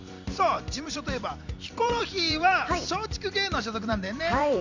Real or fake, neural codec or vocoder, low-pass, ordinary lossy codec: fake; vocoder, 44.1 kHz, 128 mel bands every 256 samples, BigVGAN v2; 7.2 kHz; none